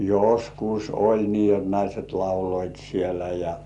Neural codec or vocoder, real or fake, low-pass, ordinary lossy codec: none; real; 10.8 kHz; none